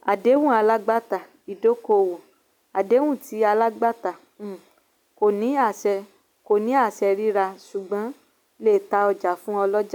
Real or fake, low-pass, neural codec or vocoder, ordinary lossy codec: real; 19.8 kHz; none; none